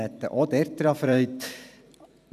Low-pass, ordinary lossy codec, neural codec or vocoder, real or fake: 14.4 kHz; none; vocoder, 44.1 kHz, 128 mel bands every 512 samples, BigVGAN v2; fake